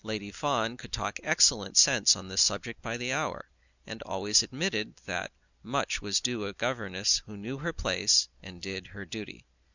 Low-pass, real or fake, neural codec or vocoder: 7.2 kHz; real; none